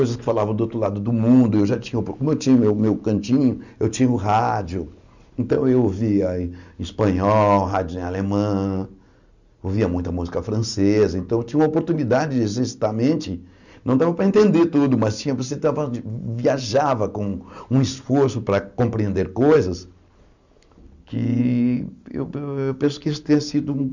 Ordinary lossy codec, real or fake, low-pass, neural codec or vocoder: none; real; 7.2 kHz; none